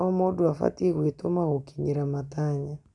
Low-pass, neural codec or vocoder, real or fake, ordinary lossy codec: 10.8 kHz; none; real; none